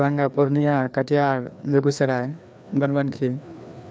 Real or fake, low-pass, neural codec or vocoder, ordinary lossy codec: fake; none; codec, 16 kHz, 2 kbps, FreqCodec, larger model; none